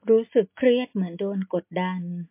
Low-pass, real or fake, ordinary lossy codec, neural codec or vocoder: 3.6 kHz; real; MP3, 24 kbps; none